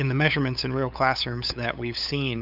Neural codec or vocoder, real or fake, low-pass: codec, 16 kHz, 8 kbps, FunCodec, trained on LibriTTS, 25 frames a second; fake; 5.4 kHz